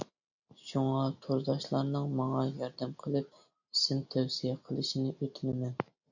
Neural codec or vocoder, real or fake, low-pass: none; real; 7.2 kHz